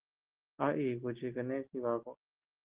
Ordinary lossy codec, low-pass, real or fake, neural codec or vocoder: Opus, 16 kbps; 3.6 kHz; real; none